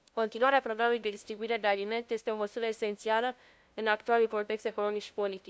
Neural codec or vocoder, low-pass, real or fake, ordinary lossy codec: codec, 16 kHz, 0.5 kbps, FunCodec, trained on LibriTTS, 25 frames a second; none; fake; none